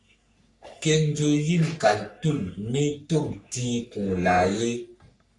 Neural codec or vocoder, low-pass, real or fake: codec, 44.1 kHz, 3.4 kbps, Pupu-Codec; 10.8 kHz; fake